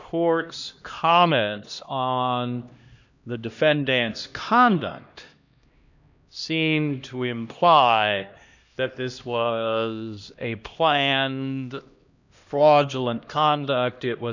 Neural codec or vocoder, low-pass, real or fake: codec, 16 kHz, 1 kbps, X-Codec, HuBERT features, trained on LibriSpeech; 7.2 kHz; fake